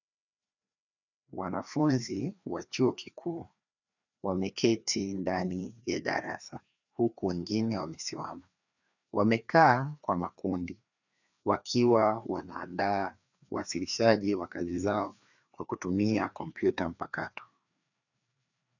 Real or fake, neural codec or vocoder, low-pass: fake; codec, 16 kHz, 2 kbps, FreqCodec, larger model; 7.2 kHz